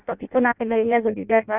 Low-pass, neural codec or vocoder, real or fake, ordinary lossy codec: 3.6 kHz; codec, 16 kHz in and 24 kHz out, 0.6 kbps, FireRedTTS-2 codec; fake; none